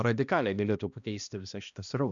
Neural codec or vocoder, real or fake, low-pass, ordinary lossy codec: codec, 16 kHz, 1 kbps, X-Codec, HuBERT features, trained on balanced general audio; fake; 7.2 kHz; MP3, 96 kbps